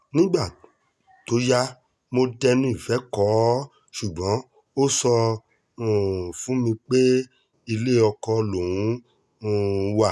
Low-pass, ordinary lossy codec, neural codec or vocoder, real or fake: none; none; none; real